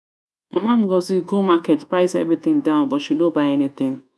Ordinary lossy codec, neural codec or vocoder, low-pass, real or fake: none; codec, 24 kHz, 1.2 kbps, DualCodec; none; fake